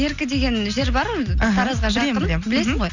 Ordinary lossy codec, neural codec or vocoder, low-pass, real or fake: none; none; 7.2 kHz; real